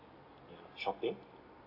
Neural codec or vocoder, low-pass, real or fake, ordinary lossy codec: none; 5.4 kHz; real; none